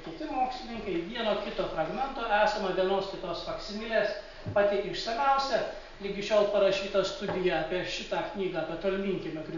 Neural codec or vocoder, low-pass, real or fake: none; 7.2 kHz; real